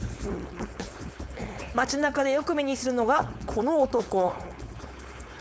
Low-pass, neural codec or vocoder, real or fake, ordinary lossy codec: none; codec, 16 kHz, 4.8 kbps, FACodec; fake; none